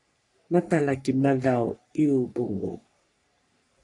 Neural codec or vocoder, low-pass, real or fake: codec, 44.1 kHz, 3.4 kbps, Pupu-Codec; 10.8 kHz; fake